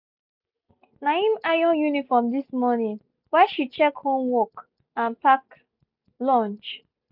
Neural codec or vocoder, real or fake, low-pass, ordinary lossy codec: none; real; 5.4 kHz; none